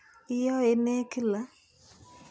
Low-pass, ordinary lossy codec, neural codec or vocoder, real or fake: none; none; none; real